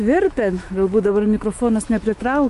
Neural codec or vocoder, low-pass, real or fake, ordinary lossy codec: autoencoder, 48 kHz, 128 numbers a frame, DAC-VAE, trained on Japanese speech; 14.4 kHz; fake; MP3, 48 kbps